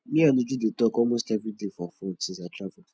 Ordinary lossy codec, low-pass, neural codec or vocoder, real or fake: none; none; none; real